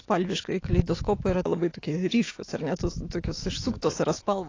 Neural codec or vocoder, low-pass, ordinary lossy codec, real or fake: autoencoder, 48 kHz, 128 numbers a frame, DAC-VAE, trained on Japanese speech; 7.2 kHz; AAC, 32 kbps; fake